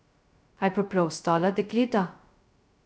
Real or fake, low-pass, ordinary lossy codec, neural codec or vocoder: fake; none; none; codec, 16 kHz, 0.2 kbps, FocalCodec